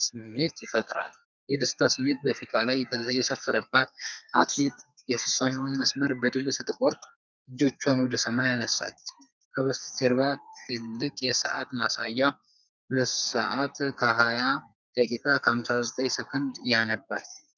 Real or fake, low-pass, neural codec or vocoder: fake; 7.2 kHz; codec, 32 kHz, 1.9 kbps, SNAC